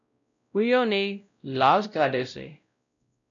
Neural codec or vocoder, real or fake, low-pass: codec, 16 kHz, 0.5 kbps, X-Codec, WavLM features, trained on Multilingual LibriSpeech; fake; 7.2 kHz